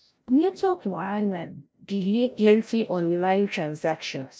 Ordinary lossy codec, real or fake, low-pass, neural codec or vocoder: none; fake; none; codec, 16 kHz, 0.5 kbps, FreqCodec, larger model